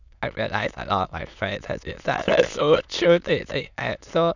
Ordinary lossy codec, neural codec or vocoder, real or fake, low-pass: none; autoencoder, 22.05 kHz, a latent of 192 numbers a frame, VITS, trained on many speakers; fake; 7.2 kHz